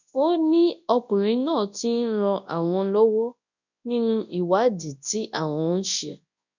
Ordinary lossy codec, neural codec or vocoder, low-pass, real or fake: none; codec, 24 kHz, 0.9 kbps, WavTokenizer, large speech release; 7.2 kHz; fake